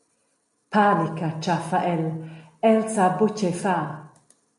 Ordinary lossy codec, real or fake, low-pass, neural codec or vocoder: MP3, 48 kbps; real; 14.4 kHz; none